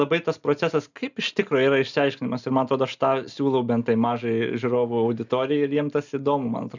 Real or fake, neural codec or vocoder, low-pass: real; none; 7.2 kHz